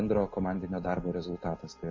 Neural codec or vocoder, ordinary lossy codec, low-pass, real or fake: none; MP3, 32 kbps; 7.2 kHz; real